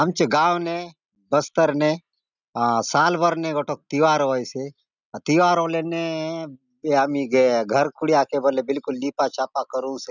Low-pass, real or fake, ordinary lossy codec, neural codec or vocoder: 7.2 kHz; real; none; none